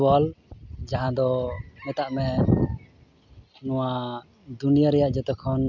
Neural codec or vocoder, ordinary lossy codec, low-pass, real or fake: none; none; 7.2 kHz; real